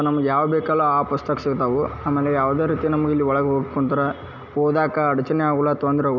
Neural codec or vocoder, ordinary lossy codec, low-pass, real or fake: none; none; 7.2 kHz; real